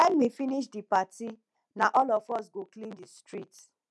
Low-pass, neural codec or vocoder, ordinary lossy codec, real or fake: none; none; none; real